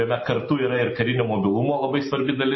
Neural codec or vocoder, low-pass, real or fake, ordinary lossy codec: none; 7.2 kHz; real; MP3, 24 kbps